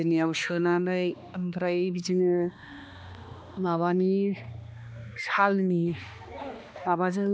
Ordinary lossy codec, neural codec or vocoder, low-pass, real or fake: none; codec, 16 kHz, 2 kbps, X-Codec, HuBERT features, trained on balanced general audio; none; fake